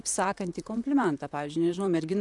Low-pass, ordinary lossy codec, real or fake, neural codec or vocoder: 10.8 kHz; MP3, 96 kbps; fake; vocoder, 44.1 kHz, 128 mel bands, Pupu-Vocoder